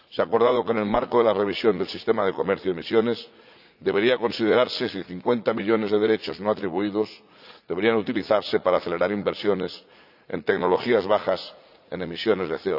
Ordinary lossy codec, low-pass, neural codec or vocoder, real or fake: none; 5.4 kHz; vocoder, 44.1 kHz, 80 mel bands, Vocos; fake